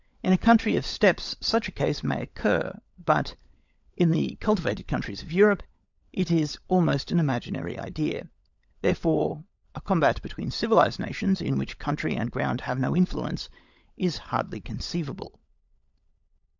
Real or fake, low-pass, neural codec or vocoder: fake; 7.2 kHz; codec, 16 kHz, 16 kbps, FunCodec, trained on LibriTTS, 50 frames a second